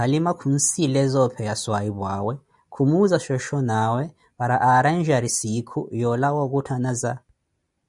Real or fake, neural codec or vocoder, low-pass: real; none; 10.8 kHz